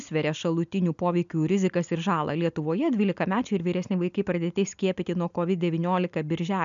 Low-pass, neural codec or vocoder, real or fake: 7.2 kHz; none; real